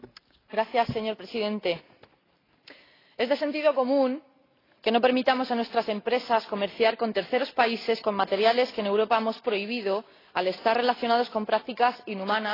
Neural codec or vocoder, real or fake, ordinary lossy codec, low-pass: none; real; AAC, 24 kbps; 5.4 kHz